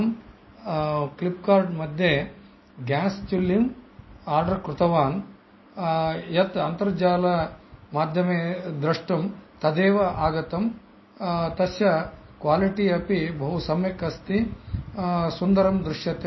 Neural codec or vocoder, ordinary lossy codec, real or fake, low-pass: none; MP3, 24 kbps; real; 7.2 kHz